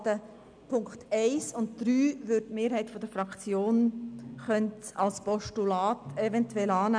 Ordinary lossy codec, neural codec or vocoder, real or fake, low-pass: none; none; real; 9.9 kHz